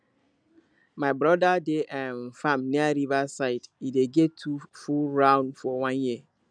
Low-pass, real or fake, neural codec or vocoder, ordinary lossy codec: 9.9 kHz; real; none; none